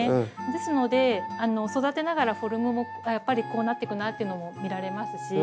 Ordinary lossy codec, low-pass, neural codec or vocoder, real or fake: none; none; none; real